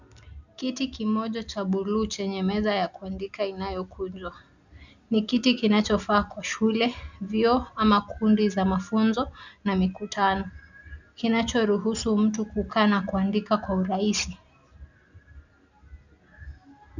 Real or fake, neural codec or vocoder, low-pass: real; none; 7.2 kHz